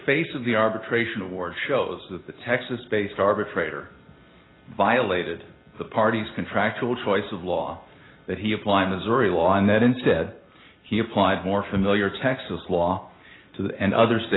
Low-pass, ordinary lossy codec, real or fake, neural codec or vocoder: 7.2 kHz; AAC, 16 kbps; real; none